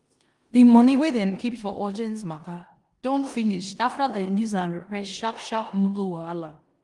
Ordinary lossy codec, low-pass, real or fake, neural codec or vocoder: Opus, 24 kbps; 10.8 kHz; fake; codec, 16 kHz in and 24 kHz out, 0.9 kbps, LongCat-Audio-Codec, four codebook decoder